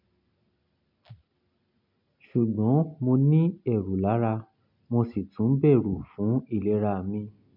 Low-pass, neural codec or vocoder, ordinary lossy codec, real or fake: 5.4 kHz; none; none; real